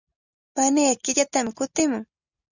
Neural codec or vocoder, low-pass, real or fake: none; 7.2 kHz; real